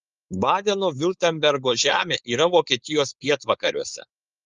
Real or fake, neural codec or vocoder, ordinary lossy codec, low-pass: fake; codec, 16 kHz, 4.8 kbps, FACodec; Opus, 32 kbps; 7.2 kHz